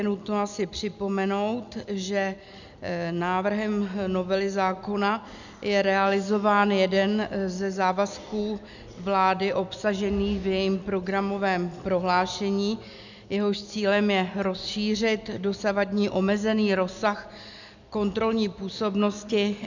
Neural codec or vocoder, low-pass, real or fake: none; 7.2 kHz; real